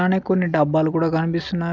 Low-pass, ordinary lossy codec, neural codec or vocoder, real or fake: none; none; none; real